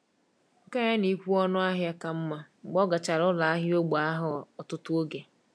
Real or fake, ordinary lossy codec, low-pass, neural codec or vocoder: real; none; none; none